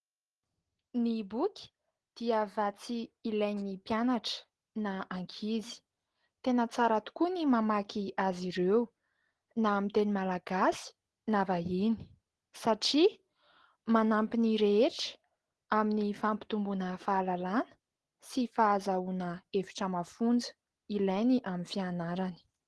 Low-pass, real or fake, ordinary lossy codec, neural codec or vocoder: 10.8 kHz; real; Opus, 16 kbps; none